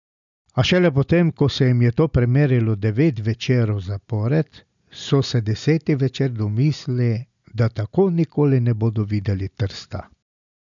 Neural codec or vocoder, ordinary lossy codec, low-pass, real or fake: none; none; 7.2 kHz; real